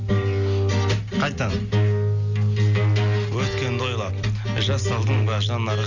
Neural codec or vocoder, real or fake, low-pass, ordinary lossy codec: none; real; 7.2 kHz; none